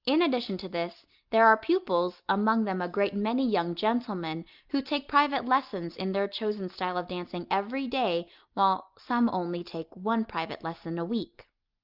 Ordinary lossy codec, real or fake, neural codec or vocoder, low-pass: Opus, 24 kbps; real; none; 5.4 kHz